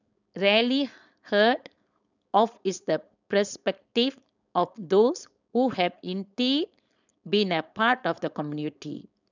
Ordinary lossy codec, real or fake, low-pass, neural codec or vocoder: none; fake; 7.2 kHz; codec, 16 kHz, 4.8 kbps, FACodec